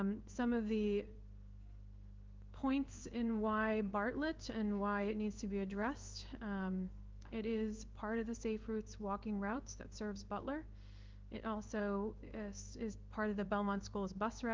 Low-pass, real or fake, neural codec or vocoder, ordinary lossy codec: 7.2 kHz; fake; codec, 16 kHz in and 24 kHz out, 1 kbps, XY-Tokenizer; Opus, 24 kbps